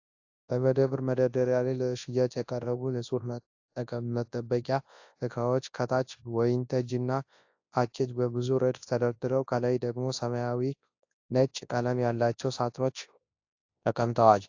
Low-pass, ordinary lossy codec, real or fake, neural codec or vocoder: 7.2 kHz; AAC, 48 kbps; fake; codec, 24 kHz, 0.9 kbps, WavTokenizer, large speech release